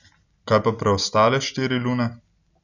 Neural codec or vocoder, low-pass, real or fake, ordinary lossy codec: none; 7.2 kHz; real; none